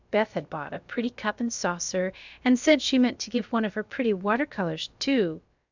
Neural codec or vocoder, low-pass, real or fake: codec, 16 kHz, about 1 kbps, DyCAST, with the encoder's durations; 7.2 kHz; fake